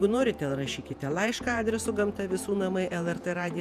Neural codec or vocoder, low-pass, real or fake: vocoder, 48 kHz, 128 mel bands, Vocos; 14.4 kHz; fake